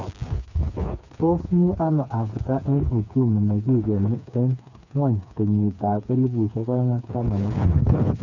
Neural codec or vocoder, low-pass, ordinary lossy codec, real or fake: codec, 16 kHz, 4 kbps, FreqCodec, smaller model; 7.2 kHz; none; fake